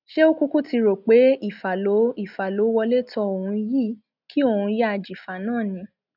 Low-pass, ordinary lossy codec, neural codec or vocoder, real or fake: 5.4 kHz; none; none; real